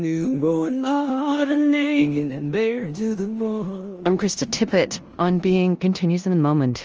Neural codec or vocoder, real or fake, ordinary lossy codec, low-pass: codec, 16 kHz in and 24 kHz out, 0.9 kbps, LongCat-Audio-Codec, four codebook decoder; fake; Opus, 24 kbps; 7.2 kHz